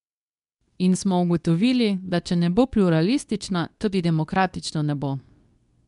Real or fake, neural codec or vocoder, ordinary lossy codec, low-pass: fake; codec, 24 kHz, 0.9 kbps, WavTokenizer, medium speech release version 2; none; 10.8 kHz